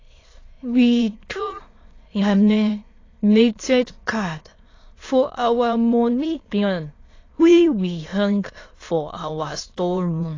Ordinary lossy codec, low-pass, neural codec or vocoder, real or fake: AAC, 32 kbps; 7.2 kHz; autoencoder, 22.05 kHz, a latent of 192 numbers a frame, VITS, trained on many speakers; fake